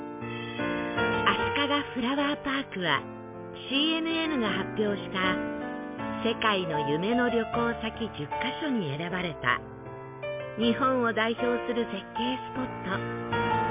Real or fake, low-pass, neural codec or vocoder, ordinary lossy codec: real; 3.6 kHz; none; MP3, 32 kbps